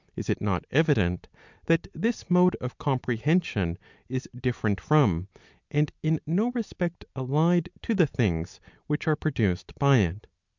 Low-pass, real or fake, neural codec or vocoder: 7.2 kHz; real; none